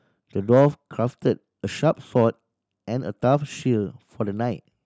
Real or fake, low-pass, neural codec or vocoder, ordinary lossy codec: real; none; none; none